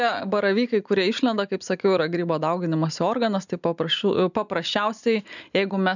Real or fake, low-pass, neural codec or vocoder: real; 7.2 kHz; none